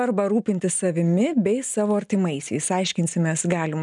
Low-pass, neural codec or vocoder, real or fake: 10.8 kHz; none; real